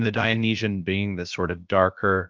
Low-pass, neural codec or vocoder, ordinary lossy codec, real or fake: 7.2 kHz; codec, 16 kHz, about 1 kbps, DyCAST, with the encoder's durations; Opus, 24 kbps; fake